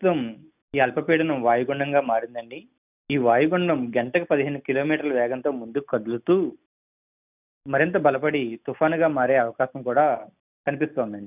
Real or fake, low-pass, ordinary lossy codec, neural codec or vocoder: real; 3.6 kHz; none; none